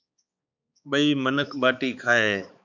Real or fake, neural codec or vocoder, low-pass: fake; codec, 16 kHz, 4 kbps, X-Codec, HuBERT features, trained on balanced general audio; 7.2 kHz